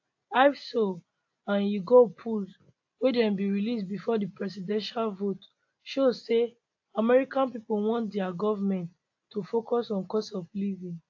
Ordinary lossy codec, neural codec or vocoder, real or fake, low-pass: AAC, 48 kbps; none; real; 7.2 kHz